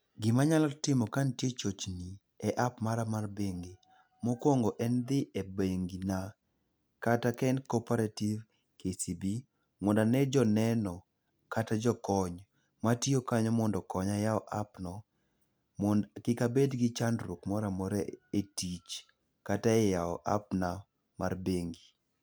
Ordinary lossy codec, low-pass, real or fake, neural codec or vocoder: none; none; real; none